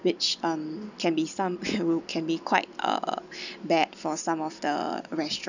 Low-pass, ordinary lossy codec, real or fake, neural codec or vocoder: 7.2 kHz; none; real; none